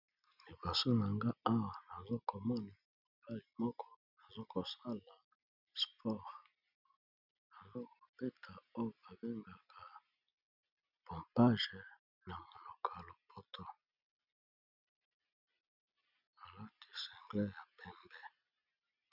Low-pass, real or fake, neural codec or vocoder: 5.4 kHz; real; none